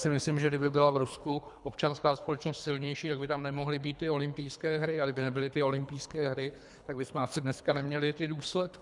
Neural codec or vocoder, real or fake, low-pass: codec, 24 kHz, 3 kbps, HILCodec; fake; 10.8 kHz